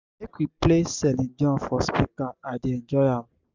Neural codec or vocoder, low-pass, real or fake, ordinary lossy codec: none; 7.2 kHz; real; none